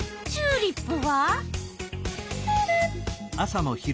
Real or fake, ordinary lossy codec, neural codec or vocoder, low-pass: real; none; none; none